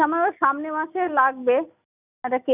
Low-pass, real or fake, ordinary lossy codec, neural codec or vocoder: 3.6 kHz; real; none; none